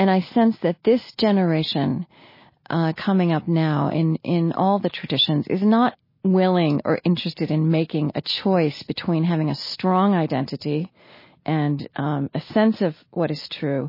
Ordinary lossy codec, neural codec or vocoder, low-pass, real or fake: MP3, 24 kbps; none; 5.4 kHz; real